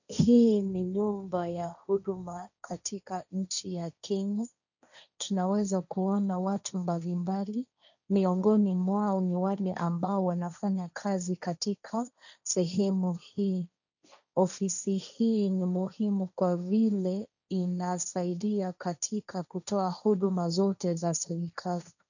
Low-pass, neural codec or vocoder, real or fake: 7.2 kHz; codec, 16 kHz, 1.1 kbps, Voila-Tokenizer; fake